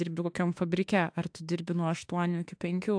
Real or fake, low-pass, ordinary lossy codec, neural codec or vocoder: fake; 9.9 kHz; AAC, 64 kbps; autoencoder, 48 kHz, 32 numbers a frame, DAC-VAE, trained on Japanese speech